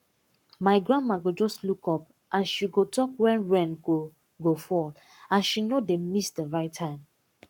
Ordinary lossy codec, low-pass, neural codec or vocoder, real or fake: none; 19.8 kHz; codec, 44.1 kHz, 7.8 kbps, Pupu-Codec; fake